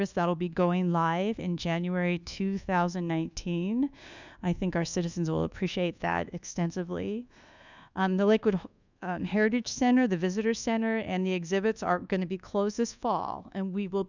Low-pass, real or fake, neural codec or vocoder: 7.2 kHz; fake; codec, 24 kHz, 1.2 kbps, DualCodec